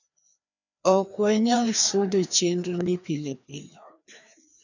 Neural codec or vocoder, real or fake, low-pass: codec, 16 kHz, 2 kbps, FreqCodec, larger model; fake; 7.2 kHz